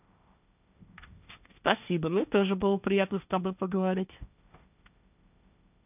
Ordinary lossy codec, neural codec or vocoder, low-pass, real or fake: none; codec, 16 kHz, 1.1 kbps, Voila-Tokenizer; 3.6 kHz; fake